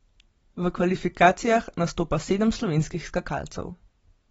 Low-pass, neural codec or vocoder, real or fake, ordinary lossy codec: 19.8 kHz; none; real; AAC, 24 kbps